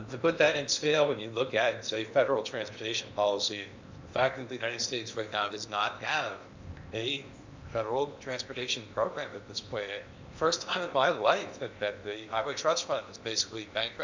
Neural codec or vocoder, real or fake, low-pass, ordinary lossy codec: codec, 16 kHz in and 24 kHz out, 0.8 kbps, FocalCodec, streaming, 65536 codes; fake; 7.2 kHz; MP3, 64 kbps